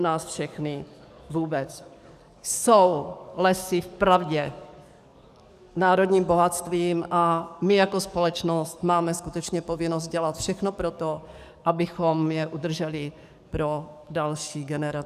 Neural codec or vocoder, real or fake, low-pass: codec, 44.1 kHz, 7.8 kbps, DAC; fake; 14.4 kHz